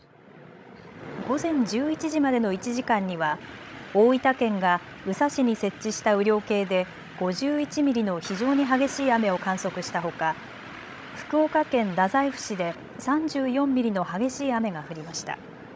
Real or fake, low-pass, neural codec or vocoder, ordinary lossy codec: fake; none; codec, 16 kHz, 16 kbps, FreqCodec, larger model; none